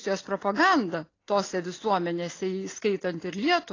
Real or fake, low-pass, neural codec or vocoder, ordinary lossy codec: real; 7.2 kHz; none; AAC, 32 kbps